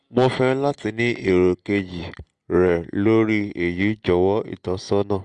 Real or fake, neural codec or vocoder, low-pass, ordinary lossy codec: real; none; 9.9 kHz; Opus, 32 kbps